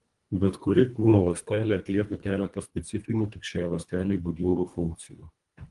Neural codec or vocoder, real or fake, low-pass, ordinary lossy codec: codec, 24 kHz, 1.5 kbps, HILCodec; fake; 10.8 kHz; Opus, 32 kbps